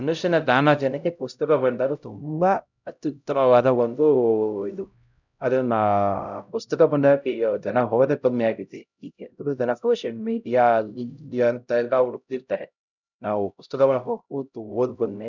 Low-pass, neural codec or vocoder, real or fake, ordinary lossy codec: 7.2 kHz; codec, 16 kHz, 0.5 kbps, X-Codec, HuBERT features, trained on LibriSpeech; fake; none